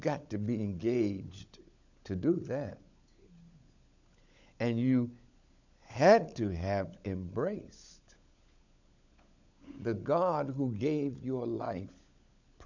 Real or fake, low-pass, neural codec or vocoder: fake; 7.2 kHz; codec, 16 kHz, 16 kbps, FunCodec, trained on LibriTTS, 50 frames a second